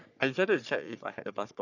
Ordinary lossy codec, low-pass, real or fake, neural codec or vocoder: none; 7.2 kHz; fake; codec, 44.1 kHz, 3.4 kbps, Pupu-Codec